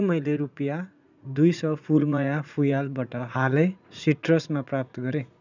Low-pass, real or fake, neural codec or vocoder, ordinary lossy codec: 7.2 kHz; fake; vocoder, 44.1 kHz, 80 mel bands, Vocos; none